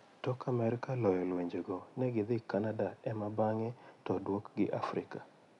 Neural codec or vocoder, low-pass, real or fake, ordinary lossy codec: none; 10.8 kHz; real; none